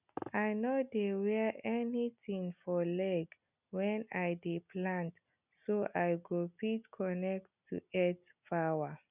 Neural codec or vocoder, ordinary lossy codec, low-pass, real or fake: none; none; 3.6 kHz; real